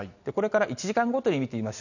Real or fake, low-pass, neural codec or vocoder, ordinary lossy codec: real; 7.2 kHz; none; none